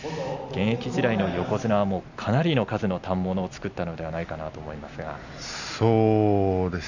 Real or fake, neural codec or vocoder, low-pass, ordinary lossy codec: real; none; 7.2 kHz; none